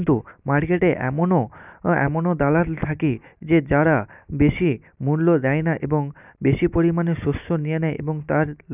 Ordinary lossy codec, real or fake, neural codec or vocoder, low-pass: none; real; none; 3.6 kHz